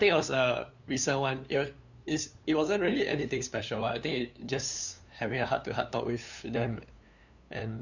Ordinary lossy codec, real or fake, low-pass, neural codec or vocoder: none; fake; 7.2 kHz; codec, 16 kHz, 2 kbps, FunCodec, trained on LibriTTS, 25 frames a second